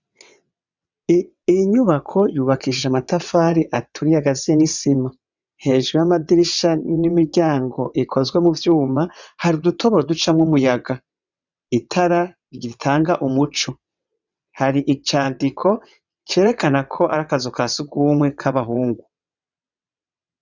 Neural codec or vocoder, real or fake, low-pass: vocoder, 22.05 kHz, 80 mel bands, WaveNeXt; fake; 7.2 kHz